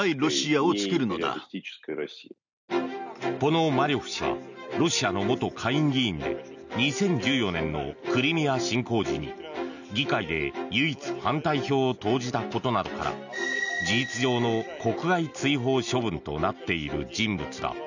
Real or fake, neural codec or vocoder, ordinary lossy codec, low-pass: real; none; none; 7.2 kHz